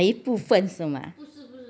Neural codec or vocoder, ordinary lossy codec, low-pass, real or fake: none; none; none; real